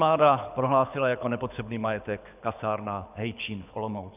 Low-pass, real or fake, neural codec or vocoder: 3.6 kHz; fake; codec, 24 kHz, 6 kbps, HILCodec